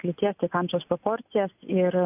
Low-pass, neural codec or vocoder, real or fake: 3.6 kHz; none; real